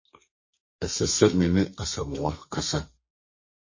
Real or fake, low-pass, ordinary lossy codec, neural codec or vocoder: fake; 7.2 kHz; MP3, 32 kbps; codec, 32 kHz, 1.9 kbps, SNAC